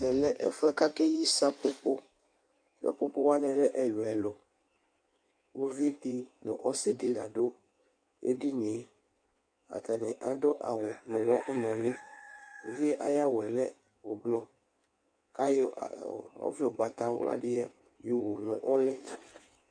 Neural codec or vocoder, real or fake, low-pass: codec, 16 kHz in and 24 kHz out, 1.1 kbps, FireRedTTS-2 codec; fake; 9.9 kHz